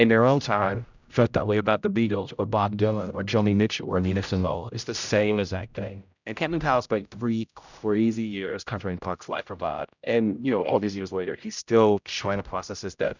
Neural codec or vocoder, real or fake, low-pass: codec, 16 kHz, 0.5 kbps, X-Codec, HuBERT features, trained on general audio; fake; 7.2 kHz